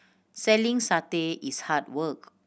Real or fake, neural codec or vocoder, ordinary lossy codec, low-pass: real; none; none; none